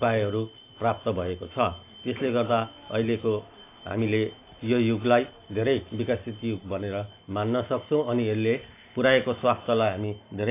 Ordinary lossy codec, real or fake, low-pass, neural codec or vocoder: AAC, 24 kbps; real; 3.6 kHz; none